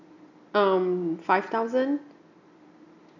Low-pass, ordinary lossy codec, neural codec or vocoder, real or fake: 7.2 kHz; none; none; real